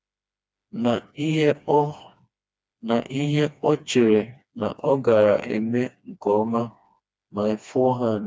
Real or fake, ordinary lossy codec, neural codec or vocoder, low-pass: fake; none; codec, 16 kHz, 2 kbps, FreqCodec, smaller model; none